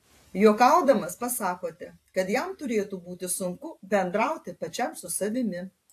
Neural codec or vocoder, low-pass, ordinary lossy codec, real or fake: vocoder, 44.1 kHz, 128 mel bands every 512 samples, BigVGAN v2; 14.4 kHz; AAC, 64 kbps; fake